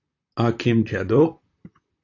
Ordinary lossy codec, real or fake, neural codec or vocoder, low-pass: AAC, 48 kbps; fake; vocoder, 44.1 kHz, 128 mel bands, Pupu-Vocoder; 7.2 kHz